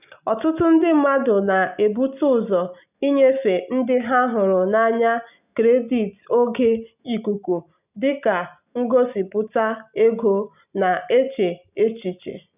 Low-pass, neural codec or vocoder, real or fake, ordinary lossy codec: 3.6 kHz; none; real; none